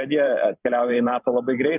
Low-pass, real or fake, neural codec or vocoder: 3.6 kHz; fake; vocoder, 44.1 kHz, 128 mel bands every 512 samples, BigVGAN v2